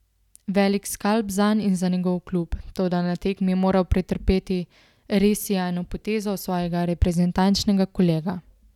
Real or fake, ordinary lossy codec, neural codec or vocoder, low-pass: real; none; none; 19.8 kHz